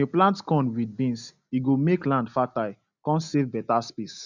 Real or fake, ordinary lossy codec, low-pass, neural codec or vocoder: real; none; 7.2 kHz; none